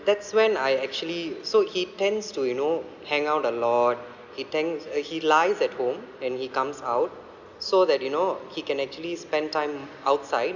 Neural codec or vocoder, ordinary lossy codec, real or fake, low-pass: none; none; real; 7.2 kHz